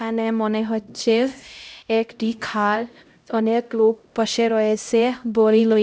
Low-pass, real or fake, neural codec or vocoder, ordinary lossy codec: none; fake; codec, 16 kHz, 0.5 kbps, X-Codec, HuBERT features, trained on LibriSpeech; none